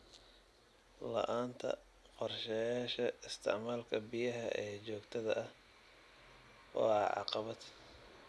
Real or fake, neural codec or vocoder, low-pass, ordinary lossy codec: real; none; none; none